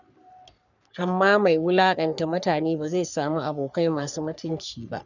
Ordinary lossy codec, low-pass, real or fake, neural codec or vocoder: none; 7.2 kHz; fake; codec, 44.1 kHz, 3.4 kbps, Pupu-Codec